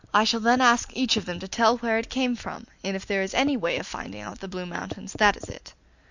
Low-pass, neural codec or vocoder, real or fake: 7.2 kHz; none; real